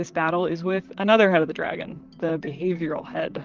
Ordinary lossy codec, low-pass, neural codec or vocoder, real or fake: Opus, 32 kbps; 7.2 kHz; vocoder, 44.1 kHz, 128 mel bands, Pupu-Vocoder; fake